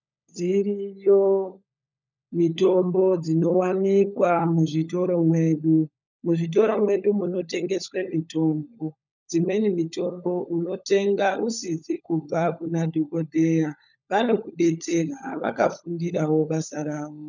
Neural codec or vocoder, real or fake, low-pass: codec, 16 kHz, 16 kbps, FunCodec, trained on LibriTTS, 50 frames a second; fake; 7.2 kHz